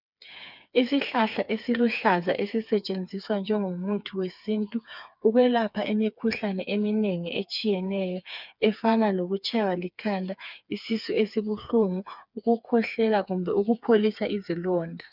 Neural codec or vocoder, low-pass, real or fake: codec, 16 kHz, 4 kbps, FreqCodec, smaller model; 5.4 kHz; fake